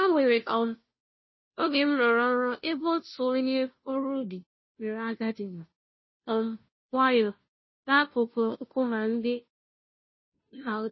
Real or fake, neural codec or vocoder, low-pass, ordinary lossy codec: fake; codec, 16 kHz, 0.5 kbps, FunCodec, trained on Chinese and English, 25 frames a second; 7.2 kHz; MP3, 24 kbps